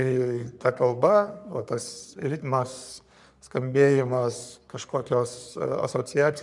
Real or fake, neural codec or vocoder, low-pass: fake; codec, 44.1 kHz, 3.4 kbps, Pupu-Codec; 10.8 kHz